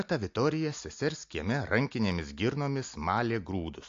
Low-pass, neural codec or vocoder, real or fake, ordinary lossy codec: 7.2 kHz; none; real; AAC, 48 kbps